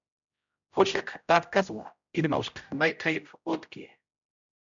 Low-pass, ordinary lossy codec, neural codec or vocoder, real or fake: 7.2 kHz; MP3, 64 kbps; codec, 16 kHz, 0.5 kbps, X-Codec, HuBERT features, trained on general audio; fake